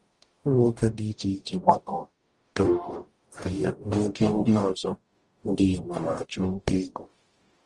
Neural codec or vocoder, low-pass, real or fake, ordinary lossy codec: codec, 44.1 kHz, 0.9 kbps, DAC; 10.8 kHz; fake; Opus, 24 kbps